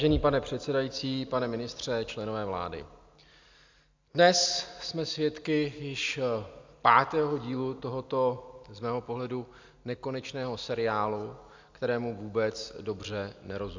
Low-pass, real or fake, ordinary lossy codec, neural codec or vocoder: 7.2 kHz; real; MP3, 64 kbps; none